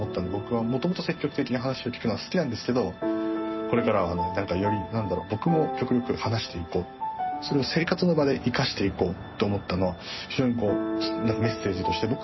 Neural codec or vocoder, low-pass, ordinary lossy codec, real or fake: none; 7.2 kHz; MP3, 24 kbps; real